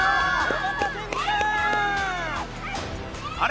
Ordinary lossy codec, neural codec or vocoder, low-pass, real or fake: none; none; none; real